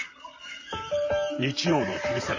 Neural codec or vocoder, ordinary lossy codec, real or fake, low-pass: vocoder, 44.1 kHz, 128 mel bands, Pupu-Vocoder; MP3, 32 kbps; fake; 7.2 kHz